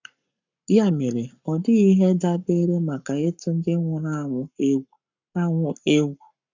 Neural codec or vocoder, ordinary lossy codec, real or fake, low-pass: codec, 44.1 kHz, 7.8 kbps, Pupu-Codec; none; fake; 7.2 kHz